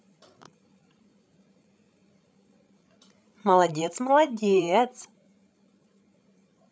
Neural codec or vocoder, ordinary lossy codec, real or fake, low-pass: codec, 16 kHz, 16 kbps, FreqCodec, larger model; none; fake; none